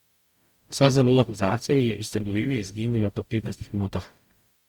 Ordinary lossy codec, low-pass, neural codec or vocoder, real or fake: Opus, 64 kbps; 19.8 kHz; codec, 44.1 kHz, 0.9 kbps, DAC; fake